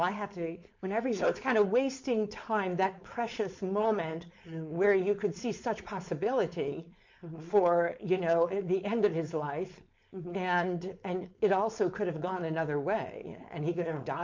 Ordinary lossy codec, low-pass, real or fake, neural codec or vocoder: MP3, 48 kbps; 7.2 kHz; fake; codec, 16 kHz, 4.8 kbps, FACodec